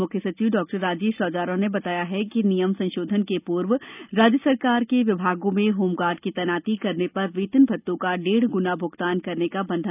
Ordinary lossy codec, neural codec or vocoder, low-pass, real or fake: none; none; 3.6 kHz; real